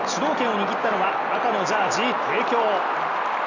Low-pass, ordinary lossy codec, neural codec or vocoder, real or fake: 7.2 kHz; none; none; real